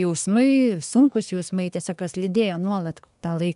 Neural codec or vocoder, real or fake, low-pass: codec, 24 kHz, 1 kbps, SNAC; fake; 10.8 kHz